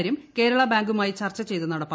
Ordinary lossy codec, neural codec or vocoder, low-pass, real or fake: none; none; none; real